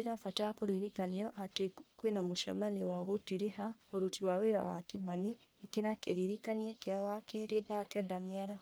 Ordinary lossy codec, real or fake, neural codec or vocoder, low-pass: none; fake; codec, 44.1 kHz, 1.7 kbps, Pupu-Codec; none